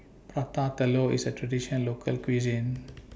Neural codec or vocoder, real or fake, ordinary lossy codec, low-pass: none; real; none; none